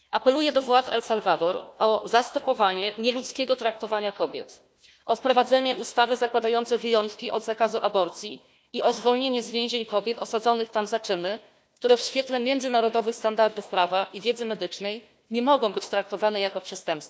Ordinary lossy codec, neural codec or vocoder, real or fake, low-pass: none; codec, 16 kHz, 1 kbps, FunCodec, trained on Chinese and English, 50 frames a second; fake; none